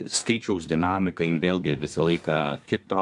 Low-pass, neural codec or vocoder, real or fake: 10.8 kHz; codec, 24 kHz, 1 kbps, SNAC; fake